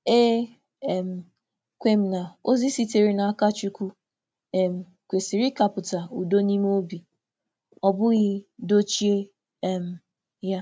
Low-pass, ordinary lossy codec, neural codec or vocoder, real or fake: none; none; none; real